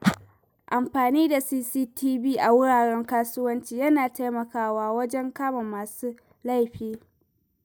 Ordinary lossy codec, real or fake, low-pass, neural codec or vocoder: none; real; none; none